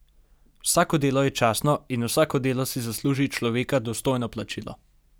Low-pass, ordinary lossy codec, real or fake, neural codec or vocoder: none; none; real; none